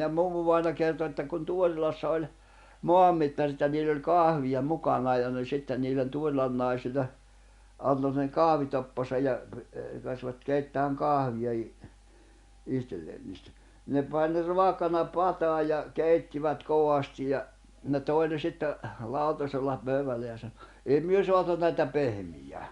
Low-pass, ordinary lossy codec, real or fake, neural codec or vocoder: 10.8 kHz; Opus, 64 kbps; real; none